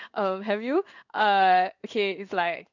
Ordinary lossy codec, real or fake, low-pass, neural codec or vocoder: none; fake; 7.2 kHz; codec, 16 kHz in and 24 kHz out, 1 kbps, XY-Tokenizer